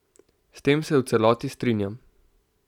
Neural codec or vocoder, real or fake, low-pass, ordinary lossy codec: none; real; 19.8 kHz; none